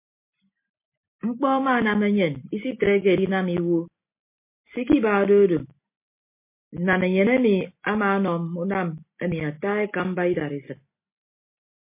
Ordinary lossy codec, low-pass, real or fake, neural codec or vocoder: MP3, 24 kbps; 3.6 kHz; real; none